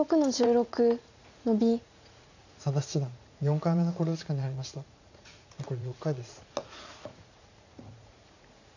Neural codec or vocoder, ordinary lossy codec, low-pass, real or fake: vocoder, 44.1 kHz, 80 mel bands, Vocos; none; 7.2 kHz; fake